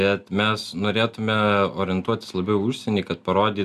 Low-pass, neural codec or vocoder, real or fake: 14.4 kHz; none; real